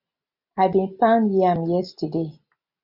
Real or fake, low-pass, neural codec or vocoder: real; 5.4 kHz; none